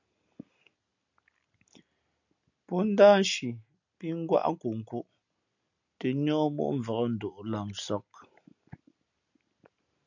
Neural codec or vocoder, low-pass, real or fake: none; 7.2 kHz; real